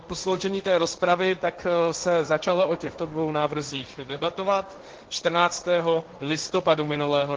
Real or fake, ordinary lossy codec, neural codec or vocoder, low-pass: fake; Opus, 16 kbps; codec, 16 kHz, 1.1 kbps, Voila-Tokenizer; 7.2 kHz